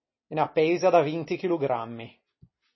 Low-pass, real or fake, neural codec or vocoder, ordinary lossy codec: 7.2 kHz; real; none; MP3, 24 kbps